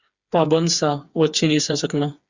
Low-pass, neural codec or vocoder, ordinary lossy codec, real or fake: 7.2 kHz; codec, 16 kHz, 4 kbps, FreqCodec, smaller model; Opus, 64 kbps; fake